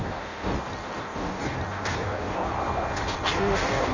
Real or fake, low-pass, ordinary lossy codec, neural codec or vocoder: fake; 7.2 kHz; none; codec, 16 kHz in and 24 kHz out, 0.6 kbps, FireRedTTS-2 codec